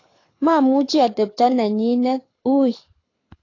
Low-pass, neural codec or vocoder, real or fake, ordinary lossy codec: 7.2 kHz; codec, 24 kHz, 6 kbps, HILCodec; fake; AAC, 32 kbps